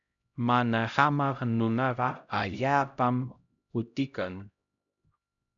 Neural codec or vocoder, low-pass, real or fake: codec, 16 kHz, 0.5 kbps, X-Codec, HuBERT features, trained on LibriSpeech; 7.2 kHz; fake